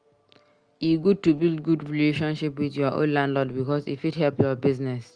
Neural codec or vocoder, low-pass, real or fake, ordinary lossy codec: none; 9.9 kHz; real; Opus, 24 kbps